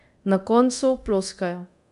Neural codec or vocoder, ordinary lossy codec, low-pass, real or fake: codec, 24 kHz, 0.9 kbps, DualCodec; AAC, 96 kbps; 10.8 kHz; fake